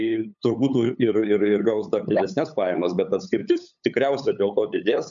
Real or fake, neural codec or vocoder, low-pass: fake; codec, 16 kHz, 8 kbps, FunCodec, trained on LibriTTS, 25 frames a second; 7.2 kHz